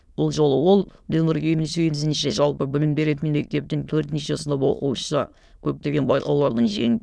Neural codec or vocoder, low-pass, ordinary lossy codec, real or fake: autoencoder, 22.05 kHz, a latent of 192 numbers a frame, VITS, trained on many speakers; none; none; fake